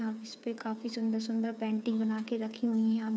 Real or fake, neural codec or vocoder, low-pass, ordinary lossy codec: fake; codec, 16 kHz, 8 kbps, FreqCodec, smaller model; none; none